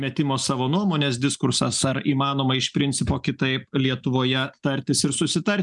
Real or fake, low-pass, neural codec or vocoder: real; 10.8 kHz; none